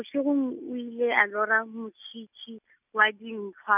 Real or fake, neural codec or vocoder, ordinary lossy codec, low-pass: real; none; none; 3.6 kHz